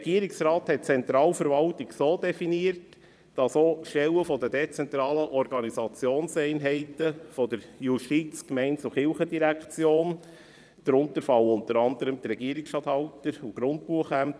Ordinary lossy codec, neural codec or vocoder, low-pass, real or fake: none; vocoder, 22.05 kHz, 80 mel bands, Vocos; none; fake